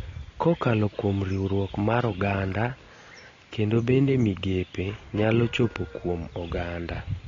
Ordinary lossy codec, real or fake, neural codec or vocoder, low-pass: AAC, 32 kbps; real; none; 7.2 kHz